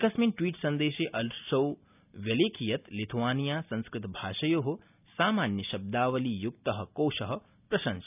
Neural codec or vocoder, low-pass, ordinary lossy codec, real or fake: none; 3.6 kHz; none; real